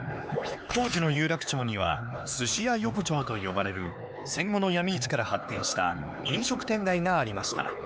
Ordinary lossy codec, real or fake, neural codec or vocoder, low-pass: none; fake; codec, 16 kHz, 4 kbps, X-Codec, HuBERT features, trained on LibriSpeech; none